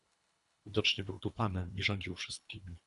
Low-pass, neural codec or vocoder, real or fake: 10.8 kHz; codec, 24 kHz, 3 kbps, HILCodec; fake